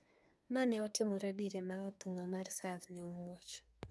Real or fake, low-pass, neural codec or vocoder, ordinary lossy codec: fake; none; codec, 24 kHz, 1 kbps, SNAC; none